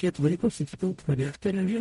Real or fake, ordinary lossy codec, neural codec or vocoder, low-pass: fake; MP3, 48 kbps; codec, 44.1 kHz, 0.9 kbps, DAC; 19.8 kHz